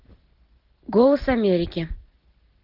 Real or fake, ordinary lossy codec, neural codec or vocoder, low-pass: real; Opus, 16 kbps; none; 5.4 kHz